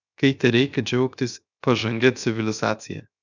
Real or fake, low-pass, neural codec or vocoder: fake; 7.2 kHz; codec, 16 kHz, 0.7 kbps, FocalCodec